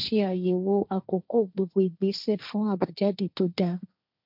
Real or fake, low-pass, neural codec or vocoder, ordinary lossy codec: fake; 5.4 kHz; codec, 16 kHz, 1.1 kbps, Voila-Tokenizer; none